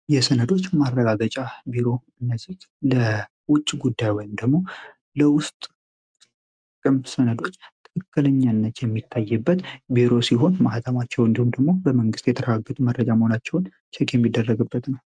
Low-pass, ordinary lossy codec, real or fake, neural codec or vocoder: 9.9 kHz; MP3, 96 kbps; real; none